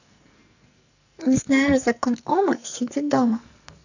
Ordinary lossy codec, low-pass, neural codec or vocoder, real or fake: none; 7.2 kHz; codec, 44.1 kHz, 2.6 kbps, SNAC; fake